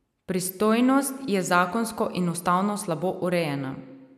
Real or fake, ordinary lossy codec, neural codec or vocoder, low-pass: real; none; none; 14.4 kHz